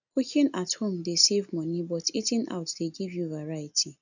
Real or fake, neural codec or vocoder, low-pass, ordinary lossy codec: real; none; 7.2 kHz; none